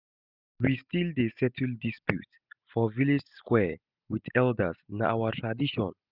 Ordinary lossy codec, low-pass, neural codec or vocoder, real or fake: none; 5.4 kHz; none; real